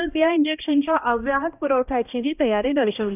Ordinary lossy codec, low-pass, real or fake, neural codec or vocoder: none; 3.6 kHz; fake; codec, 16 kHz, 1 kbps, X-Codec, HuBERT features, trained on balanced general audio